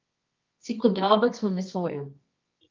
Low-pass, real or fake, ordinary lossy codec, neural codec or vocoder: 7.2 kHz; fake; Opus, 24 kbps; codec, 24 kHz, 0.9 kbps, WavTokenizer, medium music audio release